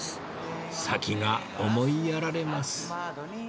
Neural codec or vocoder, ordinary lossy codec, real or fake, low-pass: none; none; real; none